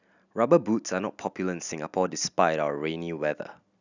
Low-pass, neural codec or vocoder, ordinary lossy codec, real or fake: 7.2 kHz; none; none; real